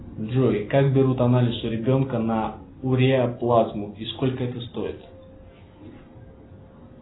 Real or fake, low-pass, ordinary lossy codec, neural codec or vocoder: real; 7.2 kHz; AAC, 16 kbps; none